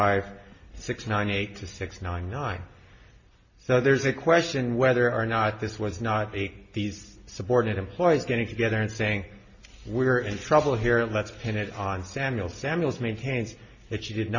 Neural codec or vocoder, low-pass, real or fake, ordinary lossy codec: none; 7.2 kHz; real; MP3, 32 kbps